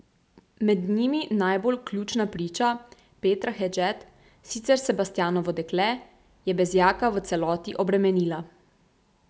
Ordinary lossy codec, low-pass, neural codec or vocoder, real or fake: none; none; none; real